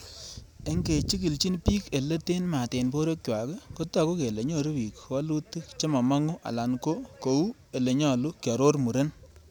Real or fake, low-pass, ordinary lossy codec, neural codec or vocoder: real; none; none; none